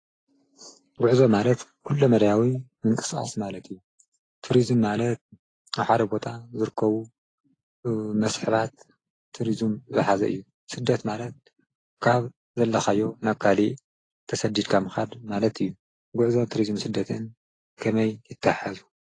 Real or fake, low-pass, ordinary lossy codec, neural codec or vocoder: real; 9.9 kHz; AAC, 32 kbps; none